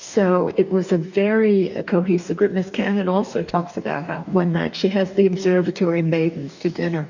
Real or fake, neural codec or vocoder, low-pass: fake; codec, 44.1 kHz, 2.6 kbps, DAC; 7.2 kHz